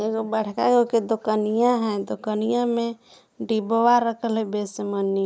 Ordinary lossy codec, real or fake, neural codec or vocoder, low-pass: none; real; none; none